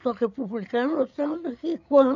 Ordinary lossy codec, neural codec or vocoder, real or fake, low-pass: none; codec, 16 kHz, 16 kbps, FreqCodec, larger model; fake; 7.2 kHz